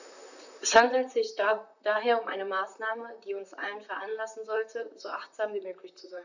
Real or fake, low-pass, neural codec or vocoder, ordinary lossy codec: fake; 7.2 kHz; vocoder, 44.1 kHz, 128 mel bands, Pupu-Vocoder; none